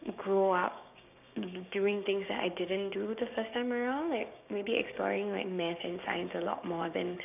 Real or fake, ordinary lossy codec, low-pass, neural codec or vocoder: fake; none; 3.6 kHz; codec, 16 kHz, 6 kbps, DAC